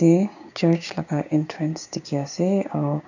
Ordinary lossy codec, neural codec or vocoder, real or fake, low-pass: none; codec, 16 kHz, 6 kbps, DAC; fake; 7.2 kHz